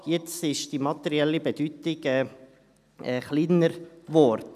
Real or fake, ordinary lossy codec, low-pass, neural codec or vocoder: real; none; 14.4 kHz; none